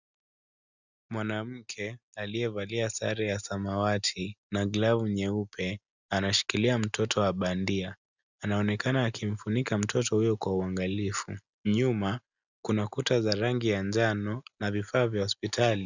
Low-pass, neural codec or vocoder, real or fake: 7.2 kHz; none; real